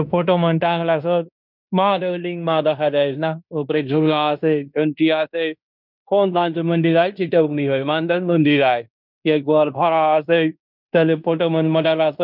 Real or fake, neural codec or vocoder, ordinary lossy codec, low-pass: fake; codec, 16 kHz in and 24 kHz out, 0.9 kbps, LongCat-Audio-Codec, fine tuned four codebook decoder; none; 5.4 kHz